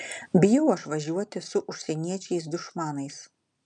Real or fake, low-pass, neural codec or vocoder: real; 10.8 kHz; none